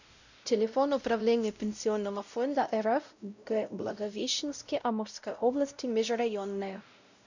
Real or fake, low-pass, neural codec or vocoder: fake; 7.2 kHz; codec, 16 kHz, 0.5 kbps, X-Codec, WavLM features, trained on Multilingual LibriSpeech